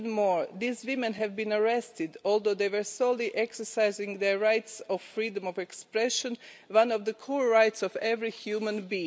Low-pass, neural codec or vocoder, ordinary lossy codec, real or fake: none; none; none; real